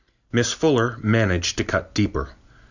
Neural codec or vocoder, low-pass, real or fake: none; 7.2 kHz; real